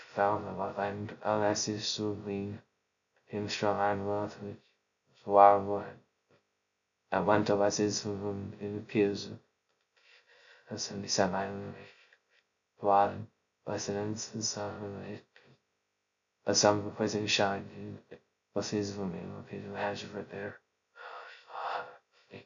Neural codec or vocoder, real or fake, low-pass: codec, 16 kHz, 0.2 kbps, FocalCodec; fake; 7.2 kHz